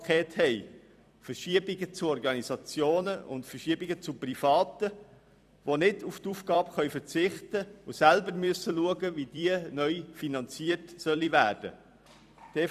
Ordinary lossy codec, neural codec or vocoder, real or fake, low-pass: none; vocoder, 44.1 kHz, 128 mel bands every 256 samples, BigVGAN v2; fake; 14.4 kHz